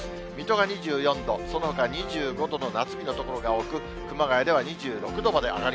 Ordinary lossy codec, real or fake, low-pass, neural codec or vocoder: none; real; none; none